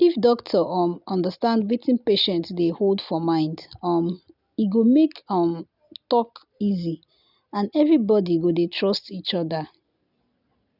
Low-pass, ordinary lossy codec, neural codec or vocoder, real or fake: 5.4 kHz; none; none; real